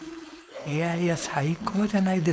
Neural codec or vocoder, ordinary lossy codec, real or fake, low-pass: codec, 16 kHz, 4.8 kbps, FACodec; none; fake; none